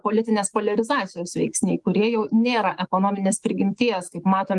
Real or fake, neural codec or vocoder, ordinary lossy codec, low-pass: fake; autoencoder, 48 kHz, 128 numbers a frame, DAC-VAE, trained on Japanese speech; Opus, 24 kbps; 10.8 kHz